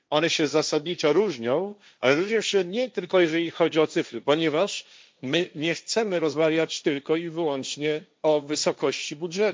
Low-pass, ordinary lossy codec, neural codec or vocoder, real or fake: none; none; codec, 16 kHz, 1.1 kbps, Voila-Tokenizer; fake